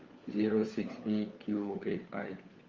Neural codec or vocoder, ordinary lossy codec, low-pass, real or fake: codec, 16 kHz, 4 kbps, FunCodec, trained on LibriTTS, 50 frames a second; Opus, 32 kbps; 7.2 kHz; fake